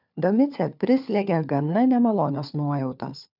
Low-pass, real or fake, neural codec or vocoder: 5.4 kHz; fake; codec, 16 kHz, 4 kbps, FunCodec, trained on LibriTTS, 50 frames a second